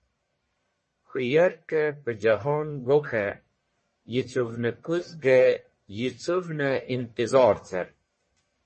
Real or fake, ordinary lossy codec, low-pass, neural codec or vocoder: fake; MP3, 32 kbps; 10.8 kHz; codec, 44.1 kHz, 1.7 kbps, Pupu-Codec